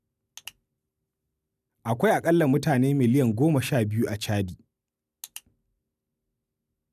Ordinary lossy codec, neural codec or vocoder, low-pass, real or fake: none; none; 14.4 kHz; real